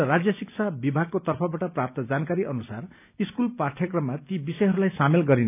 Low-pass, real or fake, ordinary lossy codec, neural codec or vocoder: 3.6 kHz; real; none; none